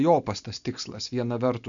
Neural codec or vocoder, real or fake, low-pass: none; real; 7.2 kHz